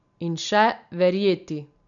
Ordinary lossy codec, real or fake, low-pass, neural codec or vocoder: none; real; 7.2 kHz; none